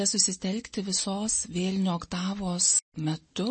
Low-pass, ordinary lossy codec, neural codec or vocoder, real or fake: 9.9 kHz; MP3, 32 kbps; none; real